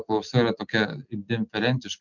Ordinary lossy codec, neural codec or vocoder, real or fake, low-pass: MP3, 64 kbps; none; real; 7.2 kHz